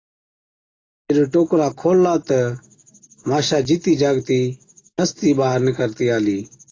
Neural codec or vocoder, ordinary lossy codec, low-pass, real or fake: none; AAC, 32 kbps; 7.2 kHz; real